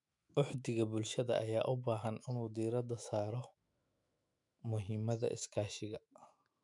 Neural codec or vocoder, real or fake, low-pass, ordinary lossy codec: autoencoder, 48 kHz, 128 numbers a frame, DAC-VAE, trained on Japanese speech; fake; 10.8 kHz; none